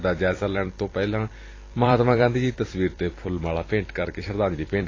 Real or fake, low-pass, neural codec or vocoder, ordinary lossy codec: fake; 7.2 kHz; vocoder, 44.1 kHz, 128 mel bands every 256 samples, BigVGAN v2; AAC, 32 kbps